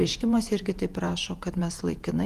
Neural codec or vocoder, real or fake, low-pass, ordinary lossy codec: vocoder, 48 kHz, 128 mel bands, Vocos; fake; 14.4 kHz; Opus, 24 kbps